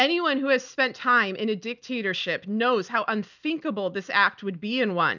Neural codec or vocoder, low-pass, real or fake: none; 7.2 kHz; real